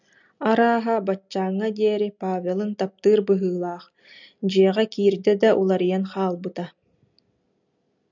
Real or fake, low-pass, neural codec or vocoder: real; 7.2 kHz; none